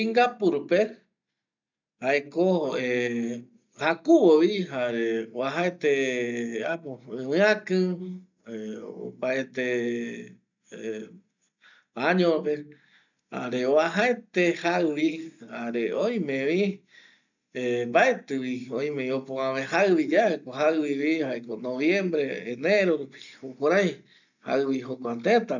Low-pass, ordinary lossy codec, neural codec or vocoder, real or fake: 7.2 kHz; none; none; real